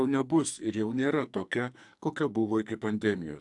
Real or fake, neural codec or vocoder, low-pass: fake; codec, 44.1 kHz, 2.6 kbps, SNAC; 10.8 kHz